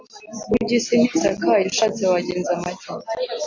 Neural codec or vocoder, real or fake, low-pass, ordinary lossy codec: none; real; 7.2 kHz; AAC, 48 kbps